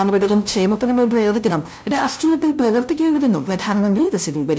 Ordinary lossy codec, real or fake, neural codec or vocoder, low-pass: none; fake; codec, 16 kHz, 0.5 kbps, FunCodec, trained on LibriTTS, 25 frames a second; none